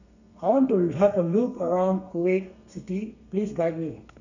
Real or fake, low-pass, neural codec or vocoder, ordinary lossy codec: fake; 7.2 kHz; codec, 32 kHz, 1.9 kbps, SNAC; none